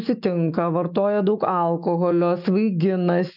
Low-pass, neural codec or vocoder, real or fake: 5.4 kHz; autoencoder, 48 kHz, 128 numbers a frame, DAC-VAE, trained on Japanese speech; fake